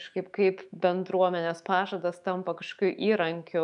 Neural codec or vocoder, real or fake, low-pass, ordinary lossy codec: autoencoder, 48 kHz, 128 numbers a frame, DAC-VAE, trained on Japanese speech; fake; 10.8 kHz; MP3, 96 kbps